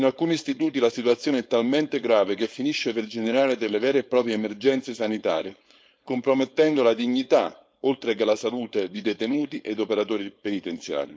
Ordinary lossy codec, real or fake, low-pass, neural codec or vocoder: none; fake; none; codec, 16 kHz, 4.8 kbps, FACodec